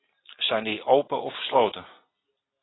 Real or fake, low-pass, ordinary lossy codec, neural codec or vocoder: real; 7.2 kHz; AAC, 16 kbps; none